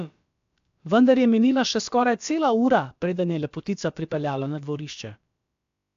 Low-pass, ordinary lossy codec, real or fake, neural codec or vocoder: 7.2 kHz; AAC, 64 kbps; fake; codec, 16 kHz, about 1 kbps, DyCAST, with the encoder's durations